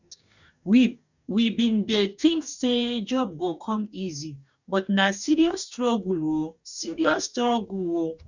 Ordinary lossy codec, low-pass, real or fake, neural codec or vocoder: none; 7.2 kHz; fake; codec, 44.1 kHz, 2.6 kbps, DAC